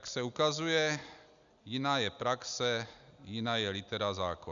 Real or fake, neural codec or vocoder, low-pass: real; none; 7.2 kHz